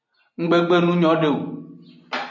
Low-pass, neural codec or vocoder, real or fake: 7.2 kHz; none; real